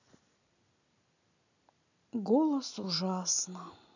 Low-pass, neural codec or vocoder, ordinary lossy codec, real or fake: 7.2 kHz; none; none; real